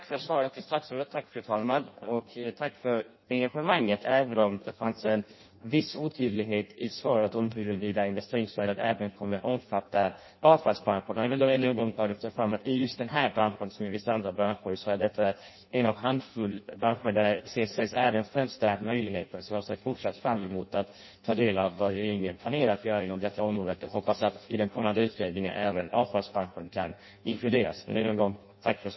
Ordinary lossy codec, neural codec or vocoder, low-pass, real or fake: MP3, 24 kbps; codec, 16 kHz in and 24 kHz out, 0.6 kbps, FireRedTTS-2 codec; 7.2 kHz; fake